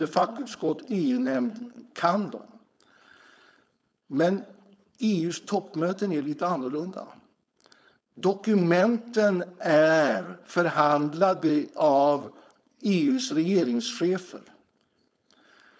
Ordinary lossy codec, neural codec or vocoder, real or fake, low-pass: none; codec, 16 kHz, 4.8 kbps, FACodec; fake; none